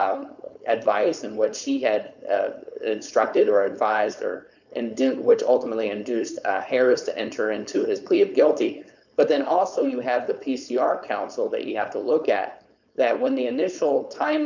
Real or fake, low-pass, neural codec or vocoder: fake; 7.2 kHz; codec, 16 kHz, 4.8 kbps, FACodec